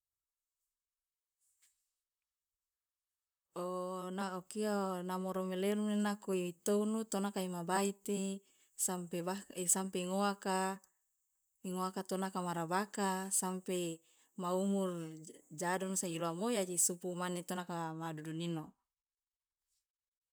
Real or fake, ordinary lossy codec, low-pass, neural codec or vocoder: fake; none; none; vocoder, 44.1 kHz, 128 mel bands, Pupu-Vocoder